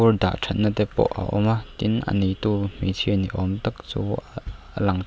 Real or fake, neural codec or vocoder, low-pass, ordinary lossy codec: real; none; none; none